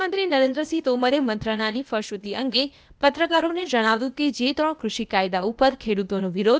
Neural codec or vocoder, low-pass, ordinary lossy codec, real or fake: codec, 16 kHz, 0.8 kbps, ZipCodec; none; none; fake